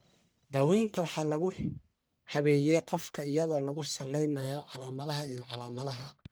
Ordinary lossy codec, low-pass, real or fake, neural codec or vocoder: none; none; fake; codec, 44.1 kHz, 1.7 kbps, Pupu-Codec